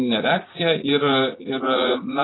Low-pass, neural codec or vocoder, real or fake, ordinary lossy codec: 7.2 kHz; vocoder, 44.1 kHz, 80 mel bands, Vocos; fake; AAC, 16 kbps